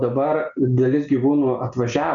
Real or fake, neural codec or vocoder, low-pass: real; none; 7.2 kHz